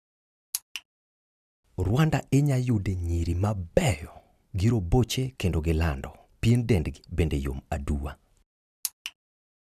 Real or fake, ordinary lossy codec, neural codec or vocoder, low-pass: real; none; none; 14.4 kHz